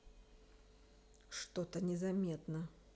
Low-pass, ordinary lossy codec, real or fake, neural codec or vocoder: none; none; real; none